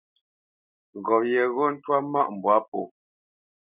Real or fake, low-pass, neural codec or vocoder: real; 3.6 kHz; none